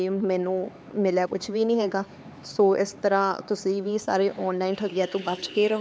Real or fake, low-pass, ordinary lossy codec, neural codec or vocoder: fake; none; none; codec, 16 kHz, 4 kbps, X-Codec, HuBERT features, trained on LibriSpeech